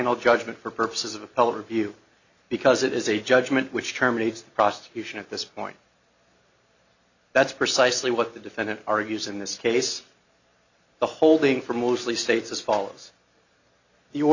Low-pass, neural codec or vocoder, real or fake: 7.2 kHz; none; real